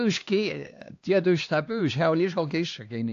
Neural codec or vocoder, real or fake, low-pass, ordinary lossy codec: codec, 16 kHz, 2 kbps, X-Codec, HuBERT features, trained on LibriSpeech; fake; 7.2 kHz; AAC, 48 kbps